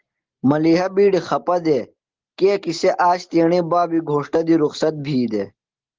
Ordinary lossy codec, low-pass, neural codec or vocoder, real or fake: Opus, 16 kbps; 7.2 kHz; none; real